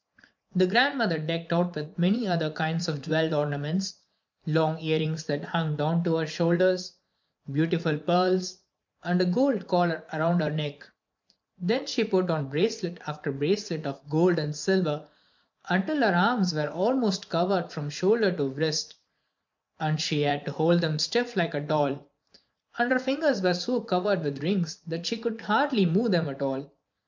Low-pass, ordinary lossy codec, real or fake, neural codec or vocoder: 7.2 kHz; MP3, 64 kbps; fake; vocoder, 44.1 kHz, 80 mel bands, Vocos